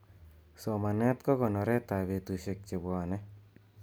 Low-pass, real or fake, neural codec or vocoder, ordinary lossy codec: none; real; none; none